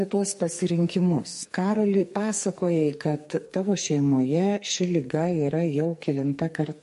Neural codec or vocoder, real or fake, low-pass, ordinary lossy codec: codec, 44.1 kHz, 2.6 kbps, SNAC; fake; 14.4 kHz; MP3, 48 kbps